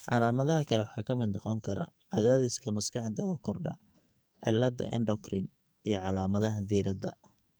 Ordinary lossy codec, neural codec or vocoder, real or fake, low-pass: none; codec, 44.1 kHz, 2.6 kbps, SNAC; fake; none